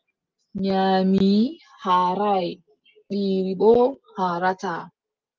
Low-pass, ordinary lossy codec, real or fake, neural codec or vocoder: 7.2 kHz; Opus, 32 kbps; real; none